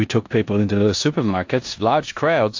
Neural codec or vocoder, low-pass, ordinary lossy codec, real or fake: codec, 16 kHz in and 24 kHz out, 0.9 kbps, LongCat-Audio-Codec, four codebook decoder; 7.2 kHz; AAC, 48 kbps; fake